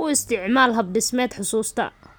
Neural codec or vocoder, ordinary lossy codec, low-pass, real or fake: none; none; none; real